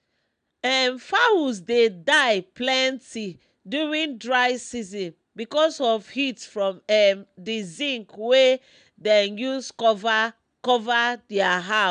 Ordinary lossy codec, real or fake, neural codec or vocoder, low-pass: none; real; none; 9.9 kHz